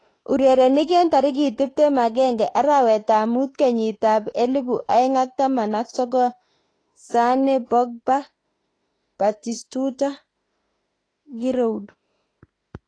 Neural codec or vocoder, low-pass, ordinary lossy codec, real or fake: autoencoder, 48 kHz, 32 numbers a frame, DAC-VAE, trained on Japanese speech; 9.9 kHz; AAC, 32 kbps; fake